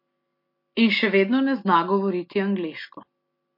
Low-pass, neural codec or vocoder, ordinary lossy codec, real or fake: 5.4 kHz; none; MP3, 32 kbps; real